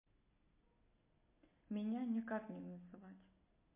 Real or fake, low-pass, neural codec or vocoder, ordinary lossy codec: real; 3.6 kHz; none; MP3, 24 kbps